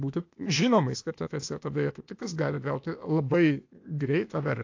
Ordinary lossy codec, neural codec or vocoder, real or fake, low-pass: AAC, 48 kbps; codec, 16 kHz, 0.8 kbps, ZipCodec; fake; 7.2 kHz